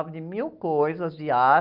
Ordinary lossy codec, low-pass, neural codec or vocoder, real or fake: Opus, 24 kbps; 5.4 kHz; none; real